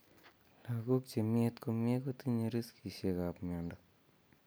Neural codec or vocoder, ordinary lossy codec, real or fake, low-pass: none; none; real; none